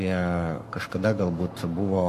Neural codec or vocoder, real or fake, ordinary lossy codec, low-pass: codec, 44.1 kHz, 7.8 kbps, Pupu-Codec; fake; AAC, 64 kbps; 14.4 kHz